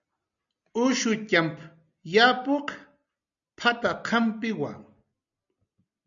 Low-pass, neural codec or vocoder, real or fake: 7.2 kHz; none; real